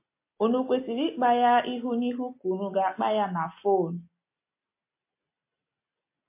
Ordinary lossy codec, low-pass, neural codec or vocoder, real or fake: MP3, 24 kbps; 3.6 kHz; none; real